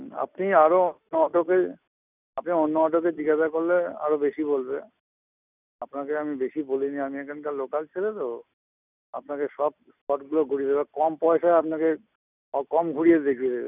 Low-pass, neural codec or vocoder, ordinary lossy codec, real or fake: 3.6 kHz; none; none; real